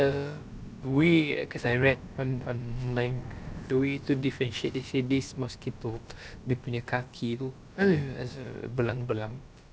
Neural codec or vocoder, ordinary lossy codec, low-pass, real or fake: codec, 16 kHz, about 1 kbps, DyCAST, with the encoder's durations; none; none; fake